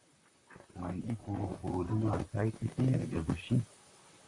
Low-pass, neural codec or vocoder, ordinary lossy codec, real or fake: 10.8 kHz; vocoder, 44.1 kHz, 128 mel bands, Pupu-Vocoder; MP3, 64 kbps; fake